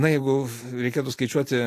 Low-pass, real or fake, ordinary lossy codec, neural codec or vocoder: 14.4 kHz; real; AAC, 64 kbps; none